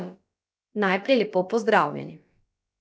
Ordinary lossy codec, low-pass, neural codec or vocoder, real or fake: none; none; codec, 16 kHz, about 1 kbps, DyCAST, with the encoder's durations; fake